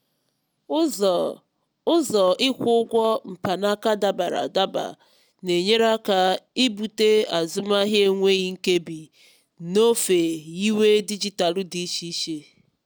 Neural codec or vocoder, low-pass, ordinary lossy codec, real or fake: none; none; none; real